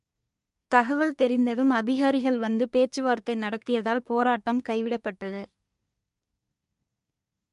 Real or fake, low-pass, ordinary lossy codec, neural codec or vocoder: fake; 10.8 kHz; MP3, 64 kbps; codec, 24 kHz, 1 kbps, SNAC